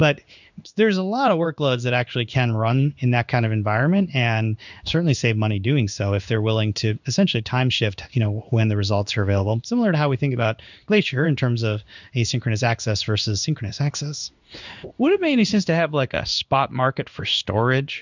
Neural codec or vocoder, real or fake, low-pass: codec, 16 kHz in and 24 kHz out, 1 kbps, XY-Tokenizer; fake; 7.2 kHz